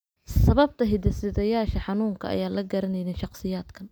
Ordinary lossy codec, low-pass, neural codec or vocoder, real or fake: none; none; none; real